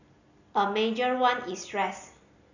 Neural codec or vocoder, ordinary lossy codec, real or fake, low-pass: none; none; real; 7.2 kHz